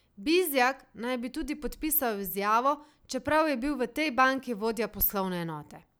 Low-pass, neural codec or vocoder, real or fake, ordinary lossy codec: none; none; real; none